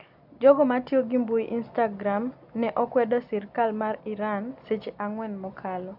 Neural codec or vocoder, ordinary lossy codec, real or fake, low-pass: none; none; real; 5.4 kHz